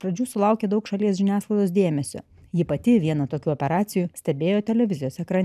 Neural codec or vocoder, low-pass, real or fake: none; 14.4 kHz; real